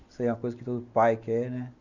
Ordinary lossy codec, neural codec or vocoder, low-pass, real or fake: none; none; 7.2 kHz; real